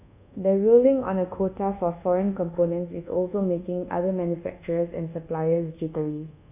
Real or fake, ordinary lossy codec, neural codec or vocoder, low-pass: fake; AAC, 32 kbps; codec, 24 kHz, 1.2 kbps, DualCodec; 3.6 kHz